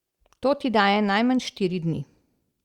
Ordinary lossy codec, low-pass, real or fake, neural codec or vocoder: Opus, 64 kbps; 19.8 kHz; real; none